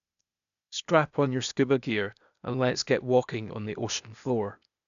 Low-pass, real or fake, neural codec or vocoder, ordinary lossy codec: 7.2 kHz; fake; codec, 16 kHz, 0.8 kbps, ZipCodec; none